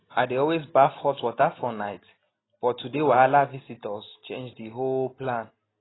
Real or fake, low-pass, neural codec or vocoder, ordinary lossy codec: real; 7.2 kHz; none; AAC, 16 kbps